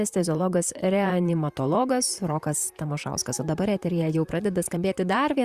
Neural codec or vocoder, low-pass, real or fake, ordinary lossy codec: vocoder, 44.1 kHz, 128 mel bands, Pupu-Vocoder; 14.4 kHz; fake; Opus, 64 kbps